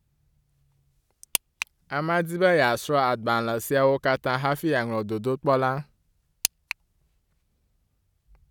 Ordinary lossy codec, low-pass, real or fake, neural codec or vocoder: none; 19.8 kHz; real; none